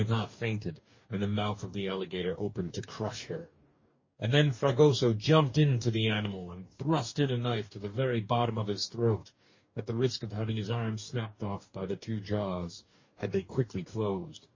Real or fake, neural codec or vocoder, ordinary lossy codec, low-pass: fake; codec, 44.1 kHz, 2.6 kbps, DAC; MP3, 32 kbps; 7.2 kHz